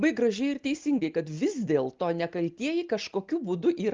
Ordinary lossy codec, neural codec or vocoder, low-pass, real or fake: Opus, 24 kbps; none; 7.2 kHz; real